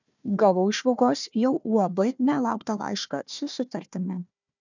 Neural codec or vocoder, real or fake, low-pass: codec, 16 kHz, 1 kbps, FunCodec, trained on Chinese and English, 50 frames a second; fake; 7.2 kHz